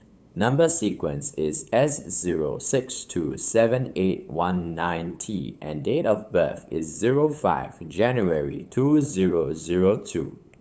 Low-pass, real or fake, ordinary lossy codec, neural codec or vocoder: none; fake; none; codec, 16 kHz, 8 kbps, FunCodec, trained on LibriTTS, 25 frames a second